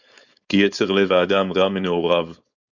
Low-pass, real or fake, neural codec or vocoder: 7.2 kHz; fake; codec, 16 kHz, 4.8 kbps, FACodec